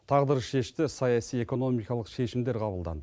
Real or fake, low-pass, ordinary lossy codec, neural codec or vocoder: real; none; none; none